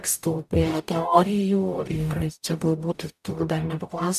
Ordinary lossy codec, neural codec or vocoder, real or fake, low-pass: MP3, 96 kbps; codec, 44.1 kHz, 0.9 kbps, DAC; fake; 14.4 kHz